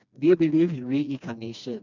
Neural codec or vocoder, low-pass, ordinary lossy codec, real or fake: codec, 16 kHz, 2 kbps, FreqCodec, smaller model; 7.2 kHz; none; fake